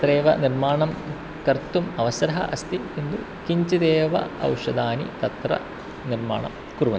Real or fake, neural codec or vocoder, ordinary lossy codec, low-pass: real; none; none; none